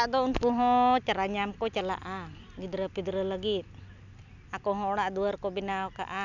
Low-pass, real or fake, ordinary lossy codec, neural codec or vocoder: 7.2 kHz; real; none; none